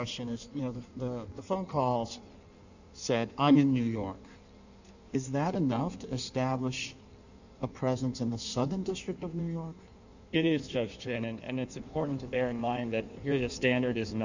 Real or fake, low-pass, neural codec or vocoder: fake; 7.2 kHz; codec, 16 kHz in and 24 kHz out, 1.1 kbps, FireRedTTS-2 codec